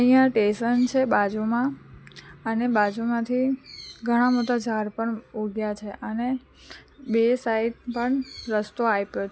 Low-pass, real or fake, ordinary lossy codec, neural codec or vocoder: none; real; none; none